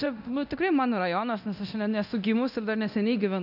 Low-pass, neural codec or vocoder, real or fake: 5.4 kHz; codec, 24 kHz, 0.9 kbps, DualCodec; fake